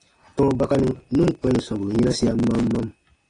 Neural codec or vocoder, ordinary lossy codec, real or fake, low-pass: none; AAC, 32 kbps; real; 9.9 kHz